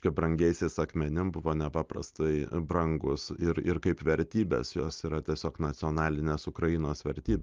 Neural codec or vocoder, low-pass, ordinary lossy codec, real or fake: none; 7.2 kHz; Opus, 32 kbps; real